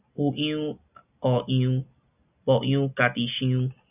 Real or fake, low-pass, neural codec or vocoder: real; 3.6 kHz; none